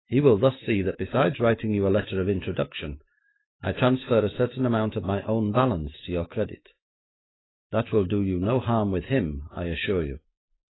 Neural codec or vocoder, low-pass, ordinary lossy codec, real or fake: autoencoder, 48 kHz, 128 numbers a frame, DAC-VAE, trained on Japanese speech; 7.2 kHz; AAC, 16 kbps; fake